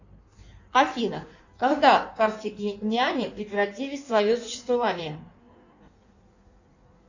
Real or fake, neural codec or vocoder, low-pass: fake; codec, 16 kHz in and 24 kHz out, 1.1 kbps, FireRedTTS-2 codec; 7.2 kHz